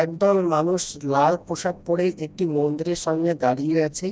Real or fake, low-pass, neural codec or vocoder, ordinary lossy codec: fake; none; codec, 16 kHz, 1 kbps, FreqCodec, smaller model; none